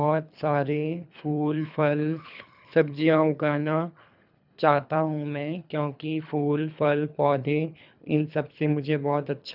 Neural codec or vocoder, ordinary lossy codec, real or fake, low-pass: codec, 24 kHz, 3 kbps, HILCodec; none; fake; 5.4 kHz